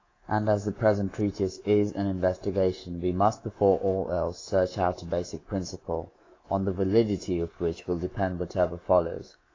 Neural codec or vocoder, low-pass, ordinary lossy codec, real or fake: codec, 24 kHz, 3.1 kbps, DualCodec; 7.2 kHz; AAC, 32 kbps; fake